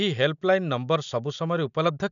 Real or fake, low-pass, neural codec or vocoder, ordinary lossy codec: real; 7.2 kHz; none; none